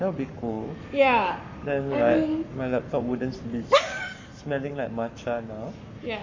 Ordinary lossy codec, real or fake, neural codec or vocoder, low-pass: MP3, 48 kbps; fake; codec, 44.1 kHz, 7.8 kbps, Pupu-Codec; 7.2 kHz